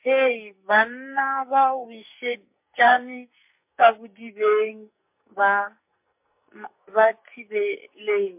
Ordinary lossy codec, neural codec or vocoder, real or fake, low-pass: MP3, 32 kbps; codec, 44.1 kHz, 2.6 kbps, SNAC; fake; 3.6 kHz